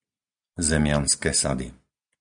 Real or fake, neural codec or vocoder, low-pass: real; none; 9.9 kHz